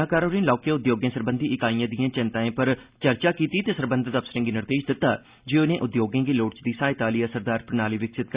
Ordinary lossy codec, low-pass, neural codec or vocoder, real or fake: Opus, 64 kbps; 3.6 kHz; none; real